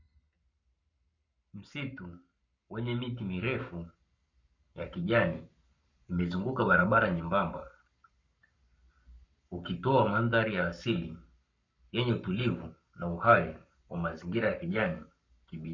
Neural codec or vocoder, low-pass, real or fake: codec, 44.1 kHz, 7.8 kbps, Pupu-Codec; 7.2 kHz; fake